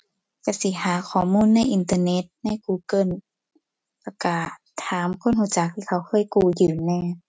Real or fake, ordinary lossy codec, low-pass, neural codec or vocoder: real; none; none; none